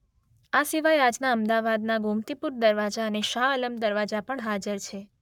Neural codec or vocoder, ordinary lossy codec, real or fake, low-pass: codec, 44.1 kHz, 7.8 kbps, Pupu-Codec; none; fake; 19.8 kHz